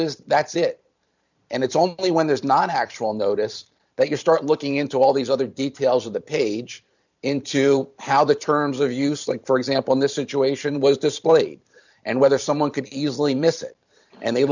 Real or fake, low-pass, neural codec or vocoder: fake; 7.2 kHz; vocoder, 44.1 kHz, 128 mel bands every 512 samples, BigVGAN v2